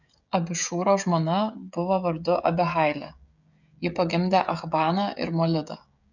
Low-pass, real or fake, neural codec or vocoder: 7.2 kHz; fake; codec, 16 kHz, 16 kbps, FreqCodec, smaller model